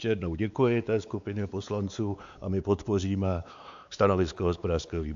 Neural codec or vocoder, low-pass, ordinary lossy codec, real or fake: codec, 16 kHz, 4 kbps, X-Codec, WavLM features, trained on Multilingual LibriSpeech; 7.2 kHz; AAC, 96 kbps; fake